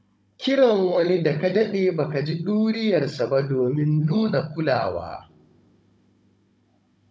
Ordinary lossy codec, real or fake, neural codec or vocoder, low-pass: none; fake; codec, 16 kHz, 16 kbps, FunCodec, trained on Chinese and English, 50 frames a second; none